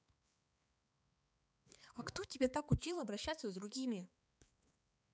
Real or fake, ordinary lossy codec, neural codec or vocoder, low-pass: fake; none; codec, 16 kHz, 4 kbps, X-Codec, HuBERT features, trained on balanced general audio; none